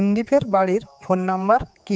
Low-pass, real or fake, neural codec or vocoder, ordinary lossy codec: none; fake; codec, 16 kHz, 4 kbps, X-Codec, HuBERT features, trained on general audio; none